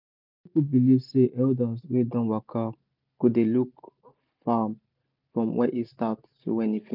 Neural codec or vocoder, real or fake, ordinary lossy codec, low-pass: none; real; none; 5.4 kHz